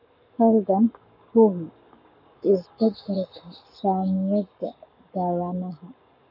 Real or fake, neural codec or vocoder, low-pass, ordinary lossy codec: fake; codec, 44.1 kHz, 7.8 kbps, Pupu-Codec; 5.4 kHz; none